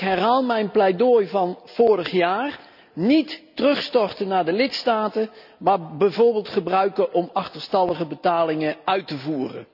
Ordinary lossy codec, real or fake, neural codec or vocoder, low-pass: none; real; none; 5.4 kHz